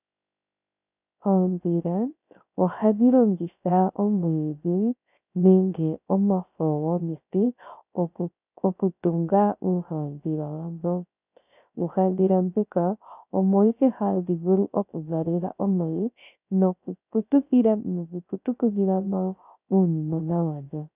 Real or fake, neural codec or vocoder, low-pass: fake; codec, 16 kHz, 0.3 kbps, FocalCodec; 3.6 kHz